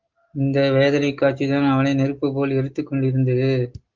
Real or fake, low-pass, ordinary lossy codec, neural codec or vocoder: real; 7.2 kHz; Opus, 32 kbps; none